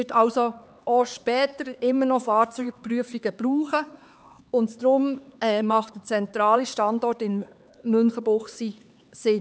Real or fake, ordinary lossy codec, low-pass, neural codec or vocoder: fake; none; none; codec, 16 kHz, 4 kbps, X-Codec, HuBERT features, trained on LibriSpeech